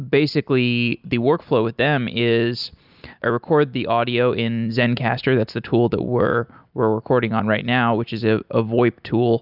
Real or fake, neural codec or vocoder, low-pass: real; none; 5.4 kHz